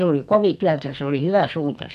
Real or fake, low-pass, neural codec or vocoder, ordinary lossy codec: fake; 14.4 kHz; codec, 44.1 kHz, 2.6 kbps, SNAC; none